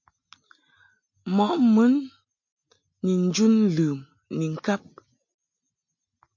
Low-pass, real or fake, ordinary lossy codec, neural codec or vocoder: 7.2 kHz; real; AAC, 48 kbps; none